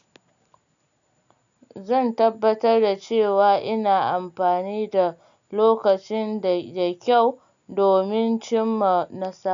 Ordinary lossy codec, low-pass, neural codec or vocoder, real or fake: none; 7.2 kHz; none; real